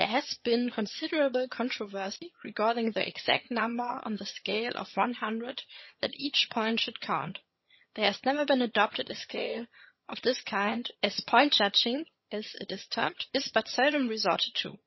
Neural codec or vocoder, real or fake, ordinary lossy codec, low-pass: vocoder, 22.05 kHz, 80 mel bands, HiFi-GAN; fake; MP3, 24 kbps; 7.2 kHz